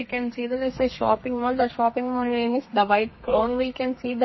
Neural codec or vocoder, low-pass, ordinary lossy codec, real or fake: codec, 32 kHz, 1.9 kbps, SNAC; 7.2 kHz; MP3, 24 kbps; fake